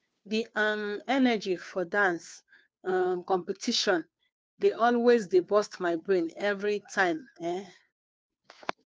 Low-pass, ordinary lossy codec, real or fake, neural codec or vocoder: none; none; fake; codec, 16 kHz, 2 kbps, FunCodec, trained on Chinese and English, 25 frames a second